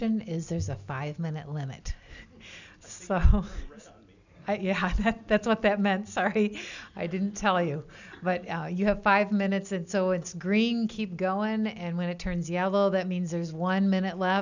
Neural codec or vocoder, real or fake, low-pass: none; real; 7.2 kHz